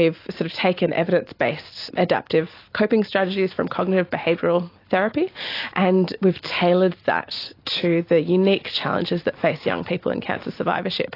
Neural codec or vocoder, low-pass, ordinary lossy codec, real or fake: none; 5.4 kHz; AAC, 32 kbps; real